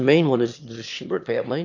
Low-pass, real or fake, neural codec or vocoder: 7.2 kHz; fake; autoencoder, 22.05 kHz, a latent of 192 numbers a frame, VITS, trained on one speaker